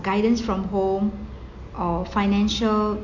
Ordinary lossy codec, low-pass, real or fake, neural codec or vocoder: AAC, 48 kbps; 7.2 kHz; real; none